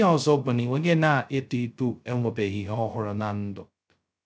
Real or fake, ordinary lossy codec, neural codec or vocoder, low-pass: fake; none; codec, 16 kHz, 0.2 kbps, FocalCodec; none